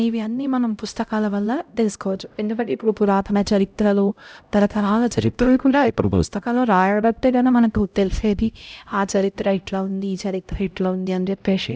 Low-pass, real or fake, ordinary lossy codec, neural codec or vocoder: none; fake; none; codec, 16 kHz, 0.5 kbps, X-Codec, HuBERT features, trained on LibriSpeech